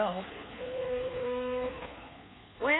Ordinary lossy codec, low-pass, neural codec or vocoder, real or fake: AAC, 16 kbps; 7.2 kHz; codec, 24 kHz, 1.2 kbps, DualCodec; fake